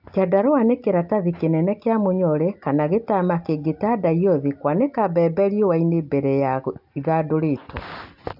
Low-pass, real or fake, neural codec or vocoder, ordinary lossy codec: 5.4 kHz; real; none; AAC, 48 kbps